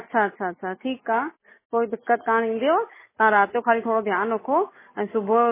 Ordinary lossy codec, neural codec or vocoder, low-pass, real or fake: MP3, 16 kbps; none; 3.6 kHz; real